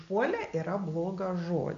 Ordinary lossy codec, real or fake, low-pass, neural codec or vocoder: MP3, 48 kbps; real; 7.2 kHz; none